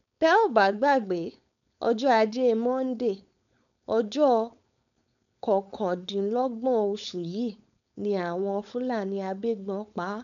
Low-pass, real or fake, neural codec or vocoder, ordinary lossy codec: 7.2 kHz; fake; codec, 16 kHz, 4.8 kbps, FACodec; none